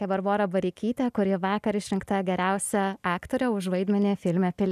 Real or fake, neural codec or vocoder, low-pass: real; none; 14.4 kHz